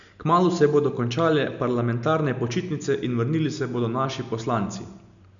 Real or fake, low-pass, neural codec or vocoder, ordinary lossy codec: real; 7.2 kHz; none; none